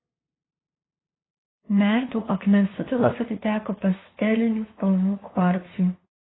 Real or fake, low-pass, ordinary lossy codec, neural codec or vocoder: fake; 7.2 kHz; AAC, 16 kbps; codec, 16 kHz, 2 kbps, FunCodec, trained on LibriTTS, 25 frames a second